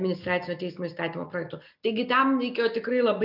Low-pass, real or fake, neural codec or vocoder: 5.4 kHz; real; none